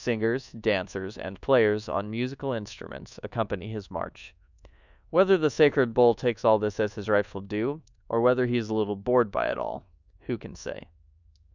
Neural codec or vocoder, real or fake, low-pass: codec, 24 kHz, 1.2 kbps, DualCodec; fake; 7.2 kHz